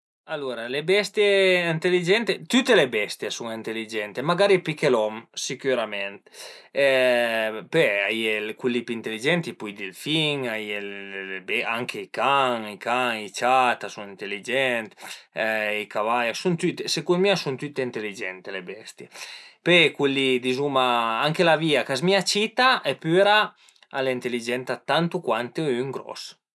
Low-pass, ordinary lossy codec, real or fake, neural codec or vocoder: none; none; real; none